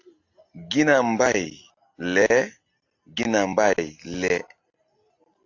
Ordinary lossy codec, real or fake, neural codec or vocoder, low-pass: AAC, 48 kbps; real; none; 7.2 kHz